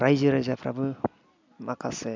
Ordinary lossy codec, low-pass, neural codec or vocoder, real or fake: MP3, 64 kbps; 7.2 kHz; none; real